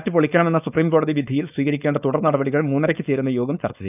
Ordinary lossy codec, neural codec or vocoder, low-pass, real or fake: none; codec, 16 kHz, 4.8 kbps, FACodec; 3.6 kHz; fake